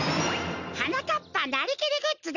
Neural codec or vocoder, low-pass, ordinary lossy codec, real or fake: none; 7.2 kHz; none; real